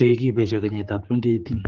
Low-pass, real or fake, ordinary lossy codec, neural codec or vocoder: 7.2 kHz; fake; Opus, 16 kbps; codec, 16 kHz, 4 kbps, X-Codec, HuBERT features, trained on general audio